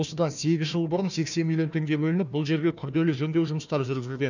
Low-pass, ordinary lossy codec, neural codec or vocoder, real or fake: 7.2 kHz; none; codec, 16 kHz, 1 kbps, FunCodec, trained on Chinese and English, 50 frames a second; fake